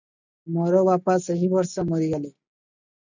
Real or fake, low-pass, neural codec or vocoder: real; 7.2 kHz; none